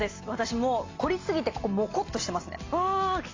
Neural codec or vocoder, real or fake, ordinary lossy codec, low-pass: none; real; AAC, 32 kbps; 7.2 kHz